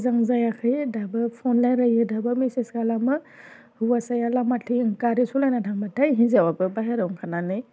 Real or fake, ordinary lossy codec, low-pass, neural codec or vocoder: real; none; none; none